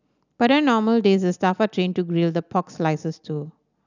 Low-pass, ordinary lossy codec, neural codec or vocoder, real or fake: 7.2 kHz; none; none; real